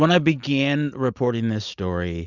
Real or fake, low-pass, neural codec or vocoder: real; 7.2 kHz; none